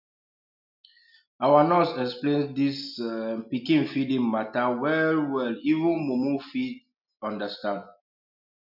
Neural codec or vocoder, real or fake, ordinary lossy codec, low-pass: none; real; none; 5.4 kHz